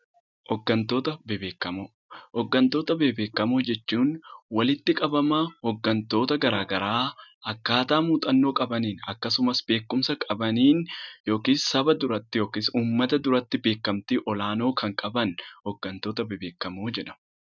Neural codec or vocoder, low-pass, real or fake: none; 7.2 kHz; real